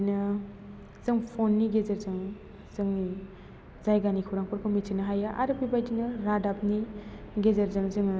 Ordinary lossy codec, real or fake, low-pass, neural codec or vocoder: none; real; none; none